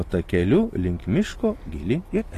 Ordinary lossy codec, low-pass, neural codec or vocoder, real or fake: AAC, 48 kbps; 14.4 kHz; none; real